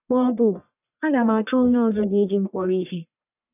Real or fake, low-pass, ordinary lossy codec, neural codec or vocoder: fake; 3.6 kHz; none; codec, 44.1 kHz, 1.7 kbps, Pupu-Codec